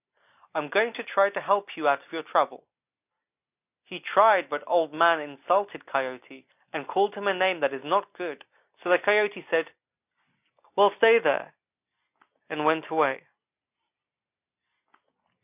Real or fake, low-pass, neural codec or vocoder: real; 3.6 kHz; none